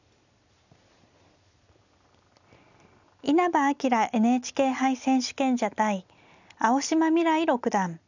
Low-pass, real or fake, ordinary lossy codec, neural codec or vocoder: 7.2 kHz; real; none; none